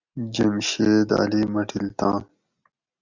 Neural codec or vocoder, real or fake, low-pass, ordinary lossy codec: none; real; 7.2 kHz; Opus, 64 kbps